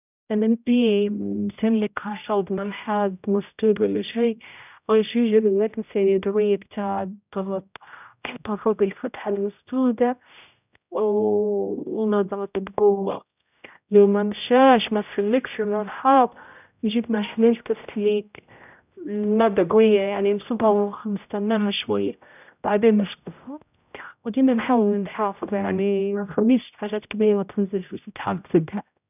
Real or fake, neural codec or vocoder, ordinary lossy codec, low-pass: fake; codec, 16 kHz, 0.5 kbps, X-Codec, HuBERT features, trained on general audio; none; 3.6 kHz